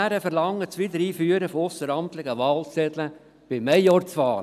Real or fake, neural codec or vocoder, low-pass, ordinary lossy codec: real; none; 14.4 kHz; none